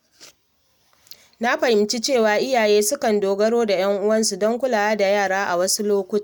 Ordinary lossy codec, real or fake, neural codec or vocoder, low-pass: none; real; none; none